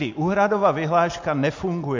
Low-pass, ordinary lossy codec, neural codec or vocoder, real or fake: 7.2 kHz; MP3, 48 kbps; none; real